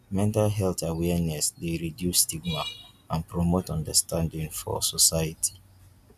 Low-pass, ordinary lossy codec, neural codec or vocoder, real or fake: 14.4 kHz; none; none; real